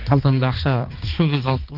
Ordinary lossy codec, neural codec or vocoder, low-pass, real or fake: Opus, 32 kbps; codec, 16 kHz, 2 kbps, X-Codec, HuBERT features, trained on balanced general audio; 5.4 kHz; fake